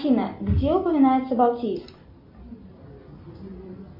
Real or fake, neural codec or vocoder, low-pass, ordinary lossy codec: real; none; 5.4 kHz; MP3, 48 kbps